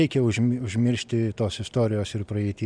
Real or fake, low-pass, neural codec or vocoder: real; 9.9 kHz; none